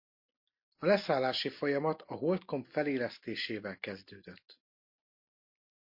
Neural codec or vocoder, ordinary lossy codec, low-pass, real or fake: none; MP3, 32 kbps; 5.4 kHz; real